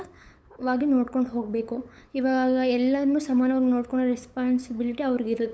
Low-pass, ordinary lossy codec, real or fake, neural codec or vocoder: none; none; fake; codec, 16 kHz, 8 kbps, FunCodec, trained on LibriTTS, 25 frames a second